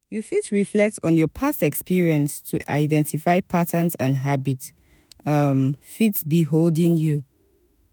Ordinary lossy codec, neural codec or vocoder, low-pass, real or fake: none; autoencoder, 48 kHz, 32 numbers a frame, DAC-VAE, trained on Japanese speech; none; fake